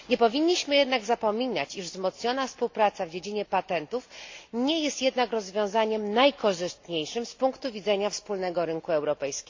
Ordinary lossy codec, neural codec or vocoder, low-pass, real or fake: AAC, 48 kbps; none; 7.2 kHz; real